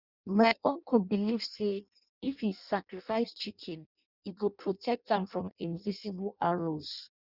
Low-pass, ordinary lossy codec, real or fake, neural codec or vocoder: 5.4 kHz; Opus, 64 kbps; fake; codec, 16 kHz in and 24 kHz out, 0.6 kbps, FireRedTTS-2 codec